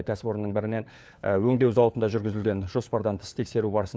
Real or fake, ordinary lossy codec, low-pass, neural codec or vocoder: fake; none; none; codec, 16 kHz, 4 kbps, FunCodec, trained on LibriTTS, 50 frames a second